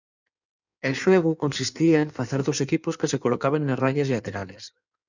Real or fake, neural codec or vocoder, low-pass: fake; codec, 16 kHz in and 24 kHz out, 1.1 kbps, FireRedTTS-2 codec; 7.2 kHz